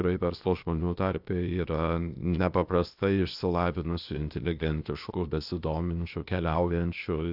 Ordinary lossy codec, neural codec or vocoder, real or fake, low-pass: AAC, 48 kbps; codec, 16 kHz, 0.8 kbps, ZipCodec; fake; 5.4 kHz